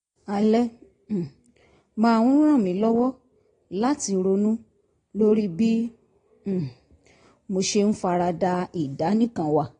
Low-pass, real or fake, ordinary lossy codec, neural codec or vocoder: 9.9 kHz; real; AAC, 32 kbps; none